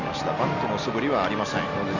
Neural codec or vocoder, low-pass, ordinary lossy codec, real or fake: none; 7.2 kHz; none; real